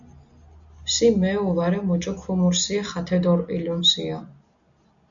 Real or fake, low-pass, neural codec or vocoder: real; 7.2 kHz; none